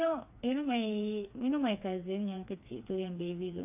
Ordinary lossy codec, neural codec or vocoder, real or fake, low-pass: AAC, 32 kbps; codec, 16 kHz, 4 kbps, FreqCodec, smaller model; fake; 3.6 kHz